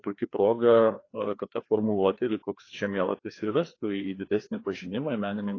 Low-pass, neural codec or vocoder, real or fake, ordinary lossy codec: 7.2 kHz; codec, 16 kHz, 2 kbps, FreqCodec, larger model; fake; AAC, 32 kbps